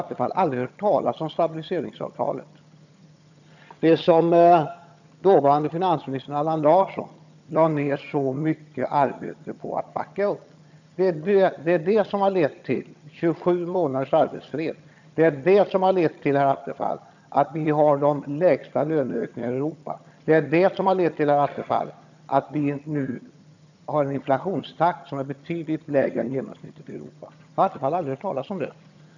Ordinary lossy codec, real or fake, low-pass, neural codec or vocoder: none; fake; 7.2 kHz; vocoder, 22.05 kHz, 80 mel bands, HiFi-GAN